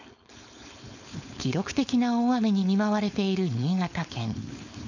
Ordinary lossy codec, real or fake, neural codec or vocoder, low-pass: none; fake; codec, 16 kHz, 4.8 kbps, FACodec; 7.2 kHz